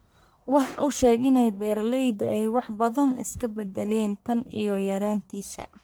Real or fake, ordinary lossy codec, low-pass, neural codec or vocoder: fake; none; none; codec, 44.1 kHz, 1.7 kbps, Pupu-Codec